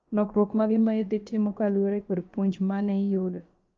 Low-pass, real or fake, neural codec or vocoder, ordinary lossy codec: 7.2 kHz; fake; codec, 16 kHz, about 1 kbps, DyCAST, with the encoder's durations; Opus, 24 kbps